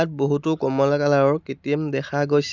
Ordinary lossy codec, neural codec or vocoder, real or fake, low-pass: none; none; real; 7.2 kHz